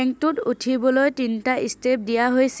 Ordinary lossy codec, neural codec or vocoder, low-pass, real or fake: none; none; none; real